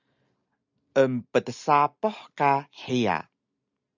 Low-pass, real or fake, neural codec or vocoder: 7.2 kHz; real; none